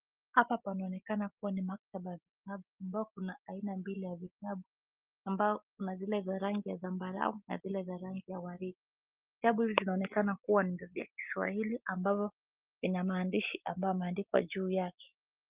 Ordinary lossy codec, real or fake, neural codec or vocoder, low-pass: Opus, 32 kbps; real; none; 3.6 kHz